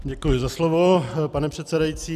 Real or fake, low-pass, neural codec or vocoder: real; 14.4 kHz; none